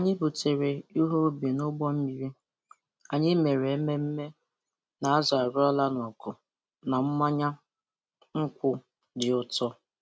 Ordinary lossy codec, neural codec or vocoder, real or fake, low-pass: none; none; real; none